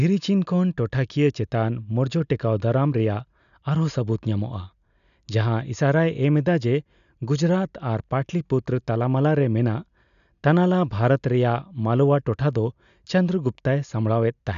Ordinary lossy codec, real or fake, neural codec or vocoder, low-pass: none; real; none; 7.2 kHz